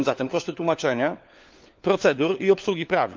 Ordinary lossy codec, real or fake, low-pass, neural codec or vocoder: Opus, 32 kbps; fake; 7.2 kHz; codec, 16 kHz, 4 kbps, FunCodec, trained on LibriTTS, 50 frames a second